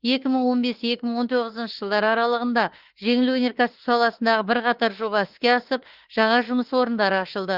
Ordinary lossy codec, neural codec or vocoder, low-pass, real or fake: Opus, 16 kbps; autoencoder, 48 kHz, 32 numbers a frame, DAC-VAE, trained on Japanese speech; 5.4 kHz; fake